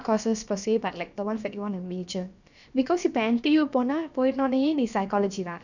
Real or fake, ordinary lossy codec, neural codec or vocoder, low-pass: fake; none; codec, 16 kHz, about 1 kbps, DyCAST, with the encoder's durations; 7.2 kHz